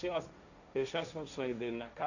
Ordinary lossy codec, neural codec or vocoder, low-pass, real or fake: none; codec, 16 kHz, 1.1 kbps, Voila-Tokenizer; 7.2 kHz; fake